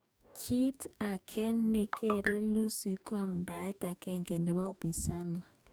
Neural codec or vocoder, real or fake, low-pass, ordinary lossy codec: codec, 44.1 kHz, 2.6 kbps, DAC; fake; none; none